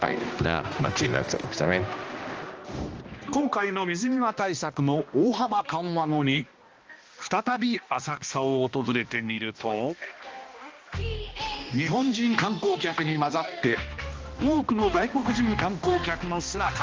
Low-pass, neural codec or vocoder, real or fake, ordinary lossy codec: 7.2 kHz; codec, 16 kHz, 1 kbps, X-Codec, HuBERT features, trained on balanced general audio; fake; Opus, 24 kbps